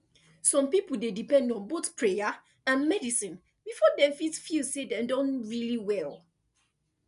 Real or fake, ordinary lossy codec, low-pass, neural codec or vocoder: real; none; 10.8 kHz; none